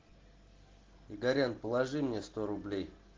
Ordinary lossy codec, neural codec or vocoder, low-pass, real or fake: Opus, 16 kbps; none; 7.2 kHz; real